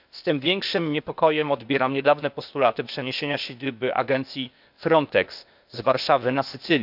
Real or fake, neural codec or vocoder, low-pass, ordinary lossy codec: fake; codec, 16 kHz, 0.8 kbps, ZipCodec; 5.4 kHz; none